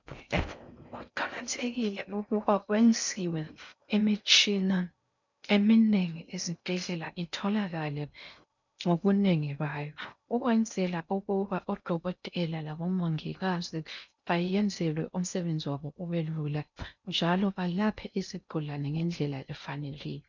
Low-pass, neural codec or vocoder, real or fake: 7.2 kHz; codec, 16 kHz in and 24 kHz out, 0.6 kbps, FocalCodec, streaming, 4096 codes; fake